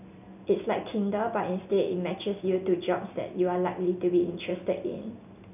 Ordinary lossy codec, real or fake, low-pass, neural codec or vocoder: none; real; 3.6 kHz; none